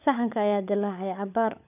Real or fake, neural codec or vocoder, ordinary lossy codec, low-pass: fake; codec, 16 kHz, 16 kbps, FunCodec, trained on LibriTTS, 50 frames a second; none; 3.6 kHz